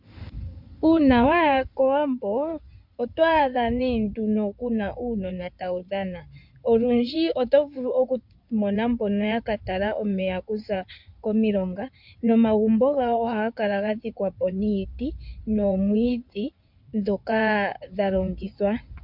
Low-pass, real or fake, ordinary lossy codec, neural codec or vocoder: 5.4 kHz; fake; MP3, 48 kbps; codec, 16 kHz in and 24 kHz out, 2.2 kbps, FireRedTTS-2 codec